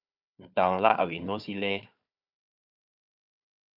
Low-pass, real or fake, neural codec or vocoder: 5.4 kHz; fake; codec, 16 kHz, 4 kbps, FunCodec, trained on Chinese and English, 50 frames a second